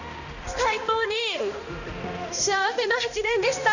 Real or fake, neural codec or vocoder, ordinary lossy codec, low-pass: fake; codec, 16 kHz, 1 kbps, X-Codec, HuBERT features, trained on balanced general audio; none; 7.2 kHz